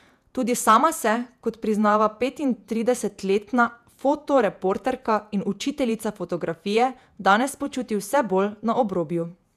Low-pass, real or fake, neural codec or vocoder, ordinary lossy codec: 14.4 kHz; fake; vocoder, 48 kHz, 128 mel bands, Vocos; none